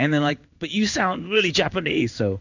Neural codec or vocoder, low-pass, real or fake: codec, 16 kHz in and 24 kHz out, 1 kbps, XY-Tokenizer; 7.2 kHz; fake